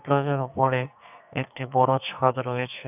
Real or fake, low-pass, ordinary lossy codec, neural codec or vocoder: fake; 3.6 kHz; none; codec, 44.1 kHz, 2.6 kbps, SNAC